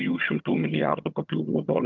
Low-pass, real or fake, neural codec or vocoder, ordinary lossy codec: 7.2 kHz; fake; vocoder, 22.05 kHz, 80 mel bands, HiFi-GAN; Opus, 32 kbps